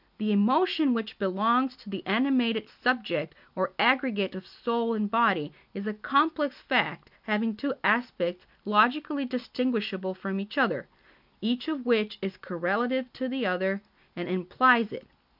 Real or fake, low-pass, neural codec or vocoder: real; 5.4 kHz; none